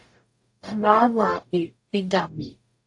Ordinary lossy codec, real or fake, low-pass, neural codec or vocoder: AAC, 64 kbps; fake; 10.8 kHz; codec, 44.1 kHz, 0.9 kbps, DAC